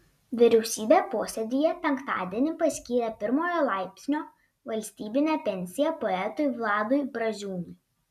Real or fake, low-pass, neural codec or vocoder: real; 14.4 kHz; none